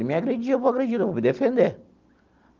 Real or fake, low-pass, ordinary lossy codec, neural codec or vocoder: real; 7.2 kHz; Opus, 32 kbps; none